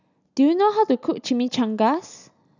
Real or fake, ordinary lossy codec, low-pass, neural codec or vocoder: real; none; 7.2 kHz; none